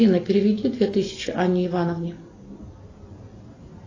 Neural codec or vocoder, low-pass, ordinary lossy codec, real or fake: none; 7.2 kHz; AAC, 32 kbps; real